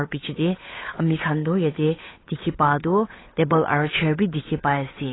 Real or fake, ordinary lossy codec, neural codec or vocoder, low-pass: fake; AAC, 16 kbps; codec, 16 kHz, 8 kbps, FunCodec, trained on Chinese and English, 25 frames a second; 7.2 kHz